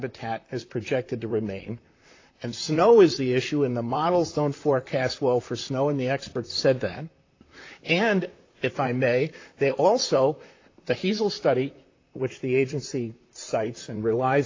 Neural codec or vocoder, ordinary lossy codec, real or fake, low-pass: vocoder, 44.1 kHz, 128 mel bands, Pupu-Vocoder; AAC, 32 kbps; fake; 7.2 kHz